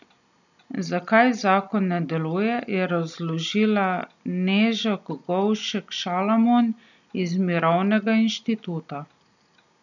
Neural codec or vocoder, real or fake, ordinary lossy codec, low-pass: none; real; none; 7.2 kHz